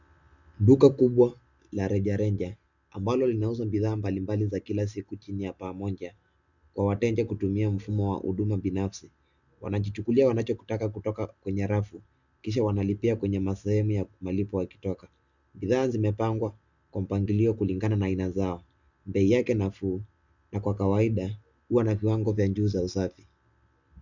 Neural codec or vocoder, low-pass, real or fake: none; 7.2 kHz; real